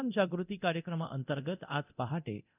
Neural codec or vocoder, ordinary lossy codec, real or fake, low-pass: codec, 24 kHz, 0.9 kbps, DualCodec; none; fake; 3.6 kHz